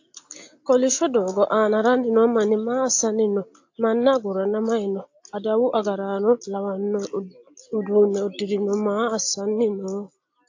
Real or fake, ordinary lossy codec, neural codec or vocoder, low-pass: real; AAC, 48 kbps; none; 7.2 kHz